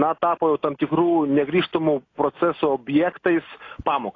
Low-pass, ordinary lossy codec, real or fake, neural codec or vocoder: 7.2 kHz; AAC, 32 kbps; real; none